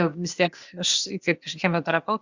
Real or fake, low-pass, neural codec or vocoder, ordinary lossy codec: fake; 7.2 kHz; codec, 16 kHz, 0.8 kbps, ZipCodec; Opus, 64 kbps